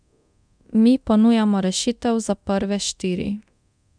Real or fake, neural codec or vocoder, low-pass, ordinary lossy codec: fake; codec, 24 kHz, 0.9 kbps, DualCodec; 9.9 kHz; none